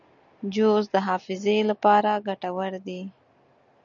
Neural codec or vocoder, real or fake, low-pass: none; real; 7.2 kHz